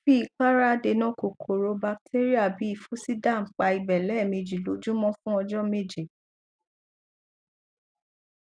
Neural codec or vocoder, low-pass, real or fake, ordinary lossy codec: none; 9.9 kHz; real; none